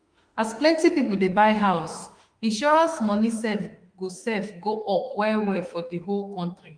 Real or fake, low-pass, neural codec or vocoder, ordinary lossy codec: fake; 9.9 kHz; autoencoder, 48 kHz, 32 numbers a frame, DAC-VAE, trained on Japanese speech; Opus, 32 kbps